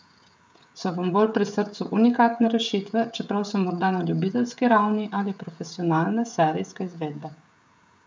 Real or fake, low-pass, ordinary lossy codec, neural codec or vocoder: fake; none; none; codec, 16 kHz, 16 kbps, FreqCodec, smaller model